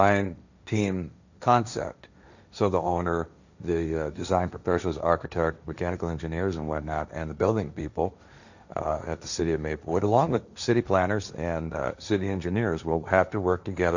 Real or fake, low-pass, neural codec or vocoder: fake; 7.2 kHz; codec, 16 kHz, 1.1 kbps, Voila-Tokenizer